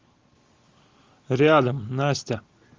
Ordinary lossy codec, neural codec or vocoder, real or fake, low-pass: Opus, 32 kbps; none; real; 7.2 kHz